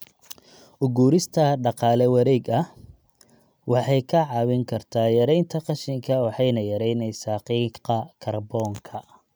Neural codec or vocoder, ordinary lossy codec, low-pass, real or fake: none; none; none; real